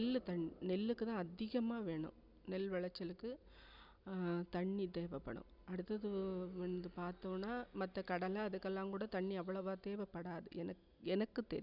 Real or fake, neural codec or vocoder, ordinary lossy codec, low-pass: real; none; none; 5.4 kHz